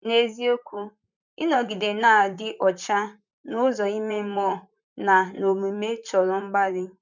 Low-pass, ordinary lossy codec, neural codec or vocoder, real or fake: 7.2 kHz; none; vocoder, 44.1 kHz, 128 mel bands, Pupu-Vocoder; fake